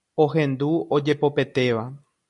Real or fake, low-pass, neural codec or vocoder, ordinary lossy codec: real; 10.8 kHz; none; AAC, 64 kbps